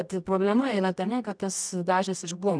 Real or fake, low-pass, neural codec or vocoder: fake; 9.9 kHz; codec, 24 kHz, 0.9 kbps, WavTokenizer, medium music audio release